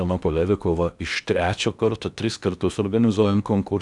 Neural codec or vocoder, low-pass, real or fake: codec, 16 kHz in and 24 kHz out, 0.8 kbps, FocalCodec, streaming, 65536 codes; 10.8 kHz; fake